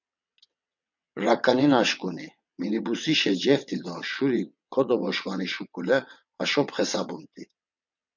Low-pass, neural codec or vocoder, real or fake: 7.2 kHz; vocoder, 22.05 kHz, 80 mel bands, WaveNeXt; fake